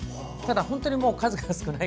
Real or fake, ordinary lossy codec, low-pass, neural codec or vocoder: real; none; none; none